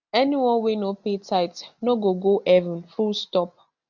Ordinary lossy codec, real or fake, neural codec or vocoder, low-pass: none; real; none; 7.2 kHz